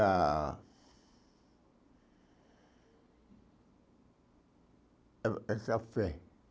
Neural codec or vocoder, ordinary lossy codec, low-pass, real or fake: none; none; none; real